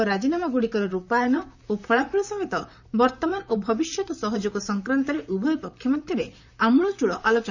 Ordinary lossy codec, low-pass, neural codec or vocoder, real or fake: none; 7.2 kHz; vocoder, 44.1 kHz, 128 mel bands, Pupu-Vocoder; fake